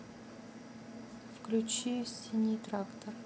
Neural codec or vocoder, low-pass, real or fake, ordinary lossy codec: none; none; real; none